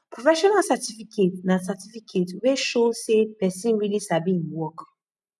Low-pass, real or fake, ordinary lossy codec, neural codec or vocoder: none; real; none; none